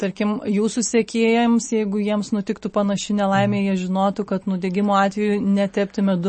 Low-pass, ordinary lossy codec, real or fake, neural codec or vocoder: 10.8 kHz; MP3, 32 kbps; real; none